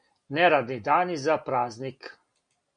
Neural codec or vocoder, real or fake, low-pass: none; real; 9.9 kHz